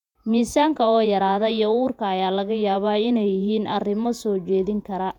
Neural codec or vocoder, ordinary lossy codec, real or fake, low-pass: vocoder, 48 kHz, 128 mel bands, Vocos; none; fake; 19.8 kHz